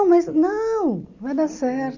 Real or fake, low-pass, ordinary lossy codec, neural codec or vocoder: real; 7.2 kHz; none; none